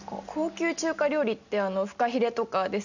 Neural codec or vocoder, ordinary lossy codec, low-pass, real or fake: none; none; 7.2 kHz; real